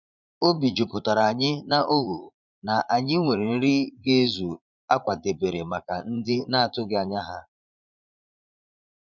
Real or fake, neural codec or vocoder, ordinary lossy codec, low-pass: fake; vocoder, 44.1 kHz, 80 mel bands, Vocos; none; 7.2 kHz